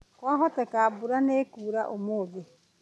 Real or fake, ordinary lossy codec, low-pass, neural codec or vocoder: real; none; none; none